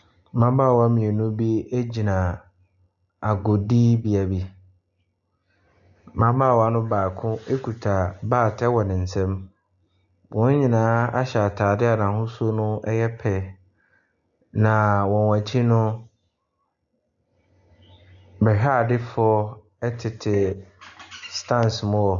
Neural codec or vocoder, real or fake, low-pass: none; real; 7.2 kHz